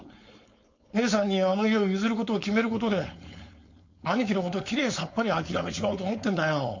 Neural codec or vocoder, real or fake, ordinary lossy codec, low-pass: codec, 16 kHz, 4.8 kbps, FACodec; fake; MP3, 48 kbps; 7.2 kHz